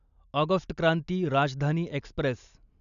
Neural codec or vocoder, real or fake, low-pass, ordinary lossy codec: none; real; 7.2 kHz; none